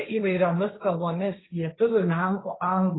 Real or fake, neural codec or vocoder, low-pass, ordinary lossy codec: fake; codec, 16 kHz, 1.1 kbps, Voila-Tokenizer; 7.2 kHz; AAC, 16 kbps